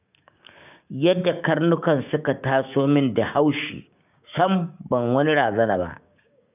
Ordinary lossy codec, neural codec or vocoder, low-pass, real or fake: none; codec, 16 kHz, 6 kbps, DAC; 3.6 kHz; fake